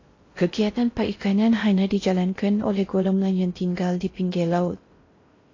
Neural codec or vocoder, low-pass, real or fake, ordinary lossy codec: codec, 16 kHz in and 24 kHz out, 0.6 kbps, FocalCodec, streaming, 4096 codes; 7.2 kHz; fake; AAC, 32 kbps